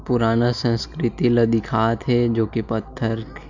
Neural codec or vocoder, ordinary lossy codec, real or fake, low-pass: none; none; real; 7.2 kHz